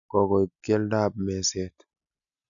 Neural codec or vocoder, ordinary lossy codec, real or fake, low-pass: none; none; real; 7.2 kHz